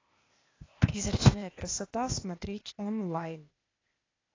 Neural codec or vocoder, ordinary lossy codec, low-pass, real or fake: codec, 16 kHz, 0.8 kbps, ZipCodec; AAC, 32 kbps; 7.2 kHz; fake